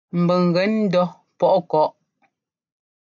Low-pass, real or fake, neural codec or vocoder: 7.2 kHz; real; none